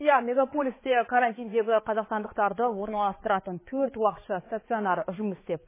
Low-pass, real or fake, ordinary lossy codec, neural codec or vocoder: 3.6 kHz; fake; MP3, 16 kbps; codec, 16 kHz, 4 kbps, X-Codec, HuBERT features, trained on balanced general audio